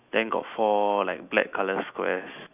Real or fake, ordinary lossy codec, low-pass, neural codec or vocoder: fake; none; 3.6 kHz; autoencoder, 48 kHz, 128 numbers a frame, DAC-VAE, trained on Japanese speech